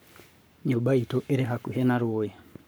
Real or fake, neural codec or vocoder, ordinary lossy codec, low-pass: fake; codec, 44.1 kHz, 7.8 kbps, Pupu-Codec; none; none